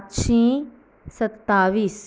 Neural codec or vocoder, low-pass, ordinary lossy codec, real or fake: none; none; none; real